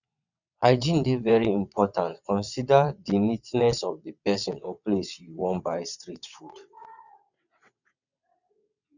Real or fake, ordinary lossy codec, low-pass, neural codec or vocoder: fake; none; 7.2 kHz; vocoder, 22.05 kHz, 80 mel bands, WaveNeXt